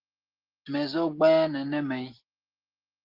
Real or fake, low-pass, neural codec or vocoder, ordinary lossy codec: real; 5.4 kHz; none; Opus, 16 kbps